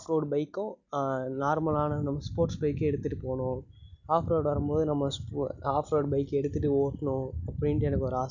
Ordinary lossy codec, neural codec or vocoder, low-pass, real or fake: none; none; 7.2 kHz; real